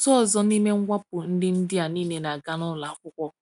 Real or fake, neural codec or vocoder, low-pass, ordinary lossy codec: real; none; 10.8 kHz; none